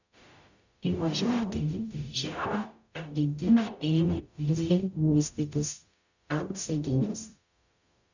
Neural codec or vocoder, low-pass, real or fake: codec, 44.1 kHz, 0.9 kbps, DAC; 7.2 kHz; fake